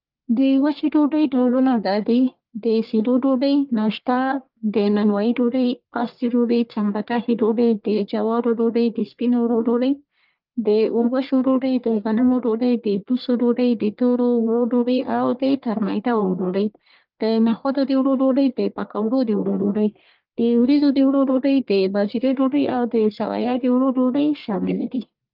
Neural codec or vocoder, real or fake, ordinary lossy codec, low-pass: codec, 44.1 kHz, 1.7 kbps, Pupu-Codec; fake; Opus, 24 kbps; 5.4 kHz